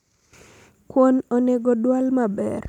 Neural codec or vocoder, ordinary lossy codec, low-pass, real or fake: none; none; 19.8 kHz; real